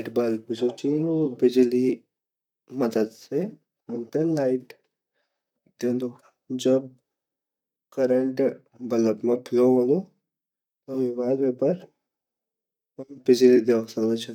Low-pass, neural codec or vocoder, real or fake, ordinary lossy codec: 19.8 kHz; vocoder, 44.1 kHz, 128 mel bands, Pupu-Vocoder; fake; none